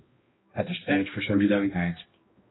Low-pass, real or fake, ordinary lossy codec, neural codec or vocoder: 7.2 kHz; fake; AAC, 16 kbps; codec, 16 kHz, 1 kbps, X-Codec, HuBERT features, trained on balanced general audio